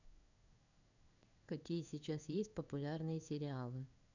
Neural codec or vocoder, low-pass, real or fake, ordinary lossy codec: codec, 16 kHz in and 24 kHz out, 1 kbps, XY-Tokenizer; 7.2 kHz; fake; none